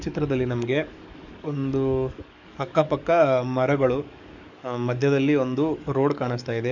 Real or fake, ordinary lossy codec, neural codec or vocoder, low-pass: fake; none; codec, 44.1 kHz, 7.8 kbps, DAC; 7.2 kHz